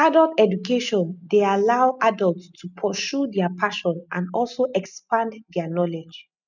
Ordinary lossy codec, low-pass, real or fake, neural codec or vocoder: none; 7.2 kHz; real; none